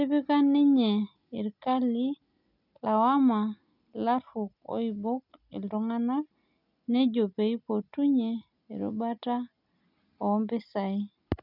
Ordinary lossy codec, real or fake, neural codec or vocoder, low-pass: none; real; none; 5.4 kHz